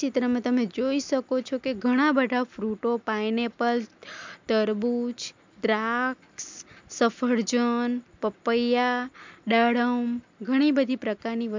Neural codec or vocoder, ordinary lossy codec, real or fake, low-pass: none; MP3, 64 kbps; real; 7.2 kHz